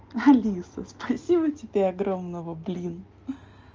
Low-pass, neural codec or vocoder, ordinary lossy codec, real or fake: 7.2 kHz; none; Opus, 32 kbps; real